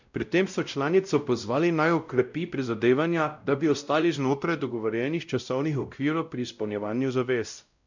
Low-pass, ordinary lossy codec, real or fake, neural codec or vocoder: 7.2 kHz; none; fake; codec, 16 kHz, 0.5 kbps, X-Codec, WavLM features, trained on Multilingual LibriSpeech